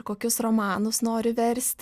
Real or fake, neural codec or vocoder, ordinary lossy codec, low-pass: real; none; Opus, 64 kbps; 14.4 kHz